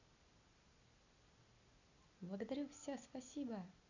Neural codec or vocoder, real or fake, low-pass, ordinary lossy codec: none; real; 7.2 kHz; none